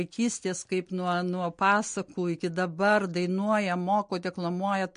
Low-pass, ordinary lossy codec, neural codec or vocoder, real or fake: 9.9 kHz; MP3, 48 kbps; none; real